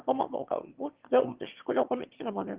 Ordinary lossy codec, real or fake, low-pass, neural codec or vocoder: Opus, 16 kbps; fake; 3.6 kHz; autoencoder, 22.05 kHz, a latent of 192 numbers a frame, VITS, trained on one speaker